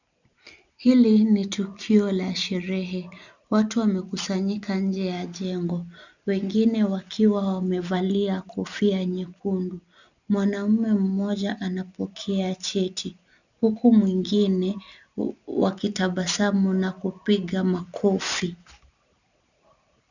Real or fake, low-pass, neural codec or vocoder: real; 7.2 kHz; none